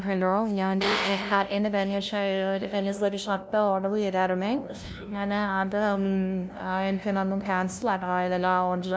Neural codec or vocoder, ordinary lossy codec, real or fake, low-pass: codec, 16 kHz, 0.5 kbps, FunCodec, trained on LibriTTS, 25 frames a second; none; fake; none